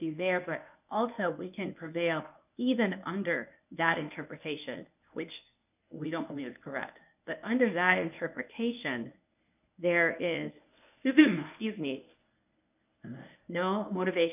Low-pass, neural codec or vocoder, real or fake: 3.6 kHz; codec, 24 kHz, 0.9 kbps, WavTokenizer, medium speech release version 1; fake